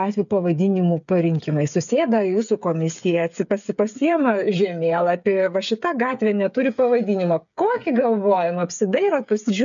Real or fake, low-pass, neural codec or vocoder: fake; 7.2 kHz; codec, 16 kHz, 8 kbps, FreqCodec, smaller model